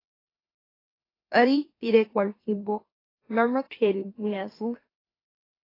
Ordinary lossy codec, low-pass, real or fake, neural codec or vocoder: AAC, 24 kbps; 5.4 kHz; fake; autoencoder, 44.1 kHz, a latent of 192 numbers a frame, MeloTTS